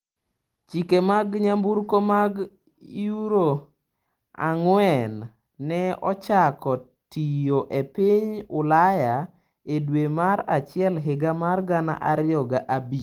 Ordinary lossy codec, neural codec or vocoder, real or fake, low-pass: Opus, 24 kbps; none; real; 19.8 kHz